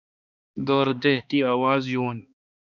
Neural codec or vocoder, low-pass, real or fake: codec, 16 kHz, 2 kbps, X-Codec, HuBERT features, trained on balanced general audio; 7.2 kHz; fake